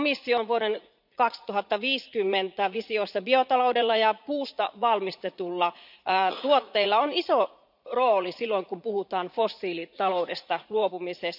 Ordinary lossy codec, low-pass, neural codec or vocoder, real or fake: none; 5.4 kHz; vocoder, 44.1 kHz, 80 mel bands, Vocos; fake